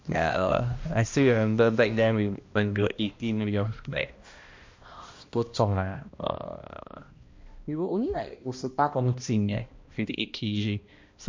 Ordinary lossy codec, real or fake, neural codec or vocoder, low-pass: MP3, 48 kbps; fake; codec, 16 kHz, 1 kbps, X-Codec, HuBERT features, trained on balanced general audio; 7.2 kHz